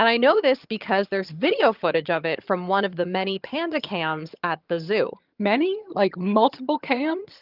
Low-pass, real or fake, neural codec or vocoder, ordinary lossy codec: 5.4 kHz; fake; vocoder, 22.05 kHz, 80 mel bands, HiFi-GAN; Opus, 24 kbps